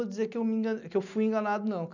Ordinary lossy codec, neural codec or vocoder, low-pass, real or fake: none; none; 7.2 kHz; real